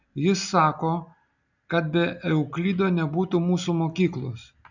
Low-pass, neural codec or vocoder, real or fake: 7.2 kHz; none; real